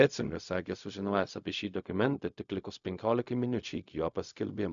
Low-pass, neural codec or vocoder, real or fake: 7.2 kHz; codec, 16 kHz, 0.4 kbps, LongCat-Audio-Codec; fake